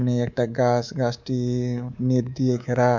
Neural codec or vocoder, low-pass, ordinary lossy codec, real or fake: codec, 24 kHz, 3.1 kbps, DualCodec; 7.2 kHz; none; fake